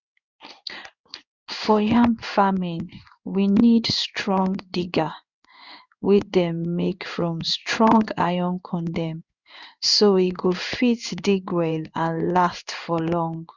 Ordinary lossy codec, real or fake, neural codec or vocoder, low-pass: Opus, 64 kbps; fake; codec, 16 kHz in and 24 kHz out, 1 kbps, XY-Tokenizer; 7.2 kHz